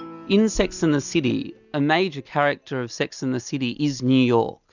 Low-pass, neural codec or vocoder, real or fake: 7.2 kHz; none; real